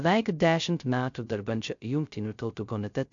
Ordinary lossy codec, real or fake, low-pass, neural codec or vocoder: AAC, 64 kbps; fake; 7.2 kHz; codec, 16 kHz, 0.2 kbps, FocalCodec